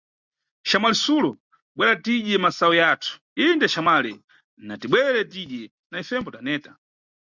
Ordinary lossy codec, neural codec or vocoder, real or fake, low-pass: Opus, 64 kbps; none; real; 7.2 kHz